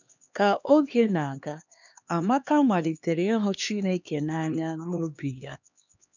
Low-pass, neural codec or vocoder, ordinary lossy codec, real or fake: 7.2 kHz; codec, 16 kHz, 2 kbps, X-Codec, HuBERT features, trained on LibriSpeech; none; fake